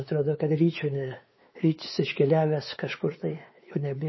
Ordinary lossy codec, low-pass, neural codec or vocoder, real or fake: MP3, 24 kbps; 7.2 kHz; codec, 16 kHz, 4 kbps, X-Codec, WavLM features, trained on Multilingual LibriSpeech; fake